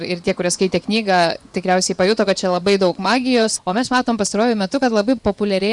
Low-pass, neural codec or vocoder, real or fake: 10.8 kHz; vocoder, 24 kHz, 100 mel bands, Vocos; fake